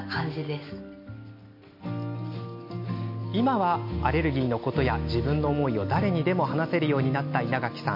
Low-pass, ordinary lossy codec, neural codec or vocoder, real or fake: 5.4 kHz; MP3, 32 kbps; none; real